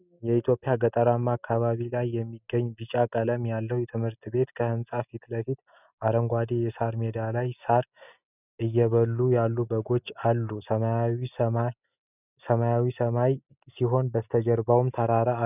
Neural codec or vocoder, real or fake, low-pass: none; real; 3.6 kHz